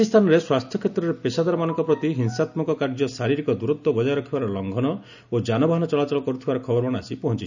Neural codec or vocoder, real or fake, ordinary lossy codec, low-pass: none; real; none; 7.2 kHz